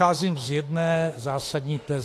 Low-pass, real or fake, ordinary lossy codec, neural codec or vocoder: 14.4 kHz; fake; AAC, 48 kbps; autoencoder, 48 kHz, 32 numbers a frame, DAC-VAE, trained on Japanese speech